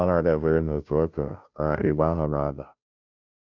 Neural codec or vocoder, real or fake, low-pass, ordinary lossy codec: codec, 16 kHz, 0.5 kbps, FunCodec, trained on Chinese and English, 25 frames a second; fake; 7.2 kHz; none